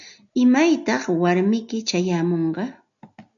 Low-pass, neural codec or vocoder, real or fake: 7.2 kHz; none; real